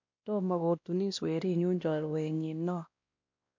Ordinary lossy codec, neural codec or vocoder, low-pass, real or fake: MP3, 64 kbps; codec, 16 kHz, 1 kbps, X-Codec, WavLM features, trained on Multilingual LibriSpeech; 7.2 kHz; fake